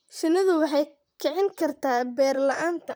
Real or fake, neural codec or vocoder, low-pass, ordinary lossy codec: fake; vocoder, 44.1 kHz, 128 mel bands, Pupu-Vocoder; none; none